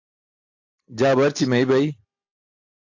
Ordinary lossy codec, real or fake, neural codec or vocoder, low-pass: AAC, 48 kbps; real; none; 7.2 kHz